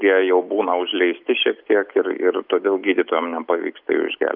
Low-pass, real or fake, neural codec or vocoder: 5.4 kHz; real; none